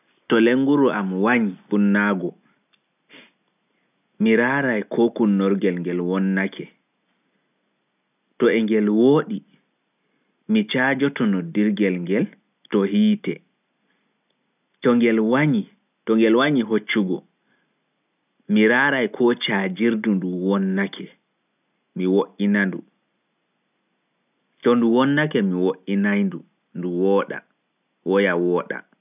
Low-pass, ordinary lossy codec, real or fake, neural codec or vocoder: 3.6 kHz; none; real; none